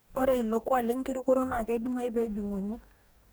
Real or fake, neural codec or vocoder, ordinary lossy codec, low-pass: fake; codec, 44.1 kHz, 2.6 kbps, DAC; none; none